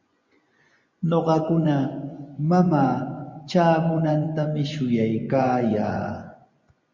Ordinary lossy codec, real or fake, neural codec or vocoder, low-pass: Opus, 64 kbps; fake; vocoder, 24 kHz, 100 mel bands, Vocos; 7.2 kHz